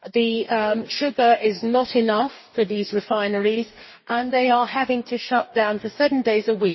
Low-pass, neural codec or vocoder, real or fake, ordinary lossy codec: 7.2 kHz; codec, 44.1 kHz, 2.6 kbps, DAC; fake; MP3, 24 kbps